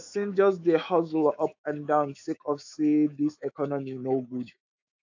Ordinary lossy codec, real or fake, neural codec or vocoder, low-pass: AAC, 48 kbps; fake; autoencoder, 48 kHz, 128 numbers a frame, DAC-VAE, trained on Japanese speech; 7.2 kHz